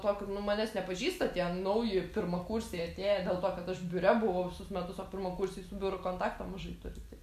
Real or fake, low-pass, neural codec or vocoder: real; 14.4 kHz; none